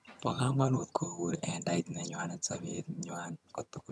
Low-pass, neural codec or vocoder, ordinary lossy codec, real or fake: none; vocoder, 22.05 kHz, 80 mel bands, HiFi-GAN; none; fake